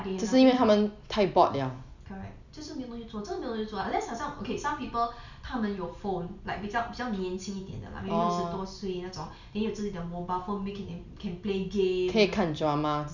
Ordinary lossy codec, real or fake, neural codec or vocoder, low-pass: none; real; none; 7.2 kHz